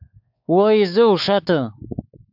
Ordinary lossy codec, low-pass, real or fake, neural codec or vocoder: AAC, 48 kbps; 5.4 kHz; fake; codec, 16 kHz, 4 kbps, X-Codec, WavLM features, trained on Multilingual LibriSpeech